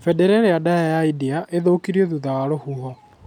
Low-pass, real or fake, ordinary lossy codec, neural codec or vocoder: 19.8 kHz; real; none; none